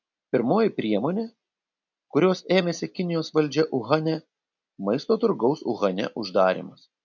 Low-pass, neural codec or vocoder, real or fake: 7.2 kHz; none; real